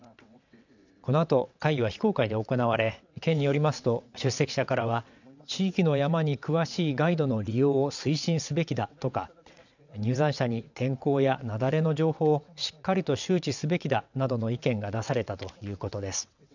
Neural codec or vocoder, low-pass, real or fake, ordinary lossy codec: vocoder, 22.05 kHz, 80 mel bands, WaveNeXt; 7.2 kHz; fake; none